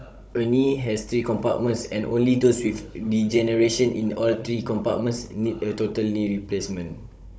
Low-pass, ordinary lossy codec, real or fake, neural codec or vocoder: none; none; fake; codec, 16 kHz, 16 kbps, FunCodec, trained on Chinese and English, 50 frames a second